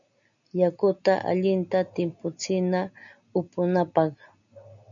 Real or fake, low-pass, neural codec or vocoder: real; 7.2 kHz; none